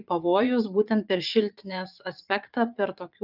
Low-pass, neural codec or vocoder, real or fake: 5.4 kHz; vocoder, 44.1 kHz, 80 mel bands, Vocos; fake